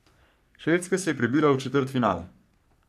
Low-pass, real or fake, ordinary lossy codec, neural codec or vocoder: 14.4 kHz; fake; none; codec, 44.1 kHz, 3.4 kbps, Pupu-Codec